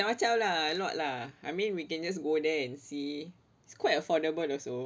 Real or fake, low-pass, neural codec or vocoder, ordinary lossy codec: real; none; none; none